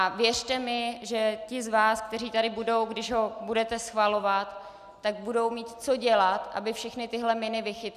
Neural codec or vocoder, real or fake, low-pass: none; real; 14.4 kHz